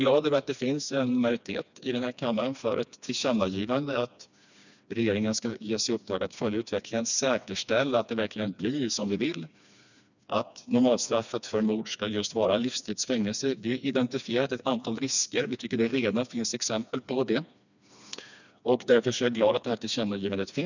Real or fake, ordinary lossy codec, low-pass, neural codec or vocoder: fake; none; 7.2 kHz; codec, 16 kHz, 2 kbps, FreqCodec, smaller model